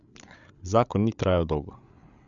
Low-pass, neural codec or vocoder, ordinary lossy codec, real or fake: 7.2 kHz; codec, 16 kHz, 8 kbps, FreqCodec, larger model; none; fake